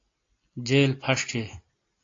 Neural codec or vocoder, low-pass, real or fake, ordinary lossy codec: none; 7.2 kHz; real; AAC, 48 kbps